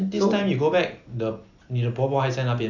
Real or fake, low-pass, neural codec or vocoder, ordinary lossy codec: real; 7.2 kHz; none; none